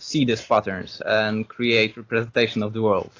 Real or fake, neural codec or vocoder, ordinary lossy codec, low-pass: real; none; AAC, 32 kbps; 7.2 kHz